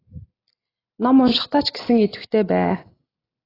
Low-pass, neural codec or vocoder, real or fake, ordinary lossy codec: 5.4 kHz; none; real; AAC, 24 kbps